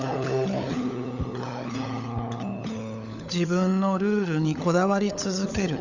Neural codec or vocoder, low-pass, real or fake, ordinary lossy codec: codec, 16 kHz, 16 kbps, FunCodec, trained on LibriTTS, 50 frames a second; 7.2 kHz; fake; none